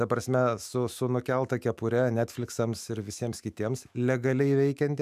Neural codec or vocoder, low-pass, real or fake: autoencoder, 48 kHz, 128 numbers a frame, DAC-VAE, trained on Japanese speech; 14.4 kHz; fake